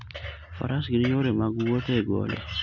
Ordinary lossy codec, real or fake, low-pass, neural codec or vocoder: none; real; 7.2 kHz; none